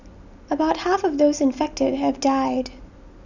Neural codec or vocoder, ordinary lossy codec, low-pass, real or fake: none; none; 7.2 kHz; real